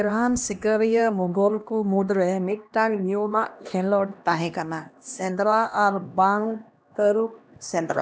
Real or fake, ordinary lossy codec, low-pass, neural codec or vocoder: fake; none; none; codec, 16 kHz, 1 kbps, X-Codec, HuBERT features, trained on LibriSpeech